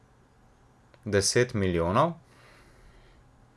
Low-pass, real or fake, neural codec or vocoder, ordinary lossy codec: none; real; none; none